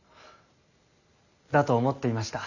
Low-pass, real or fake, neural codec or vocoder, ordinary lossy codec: 7.2 kHz; real; none; none